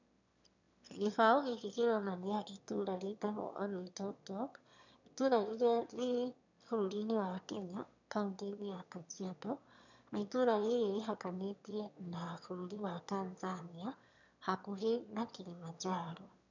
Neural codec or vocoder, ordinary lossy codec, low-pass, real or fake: autoencoder, 22.05 kHz, a latent of 192 numbers a frame, VITS, trained on one speaker; none; 7.2 kHz; fake